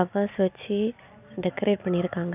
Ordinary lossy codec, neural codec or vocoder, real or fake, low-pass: none; none; real; 3.6 kHz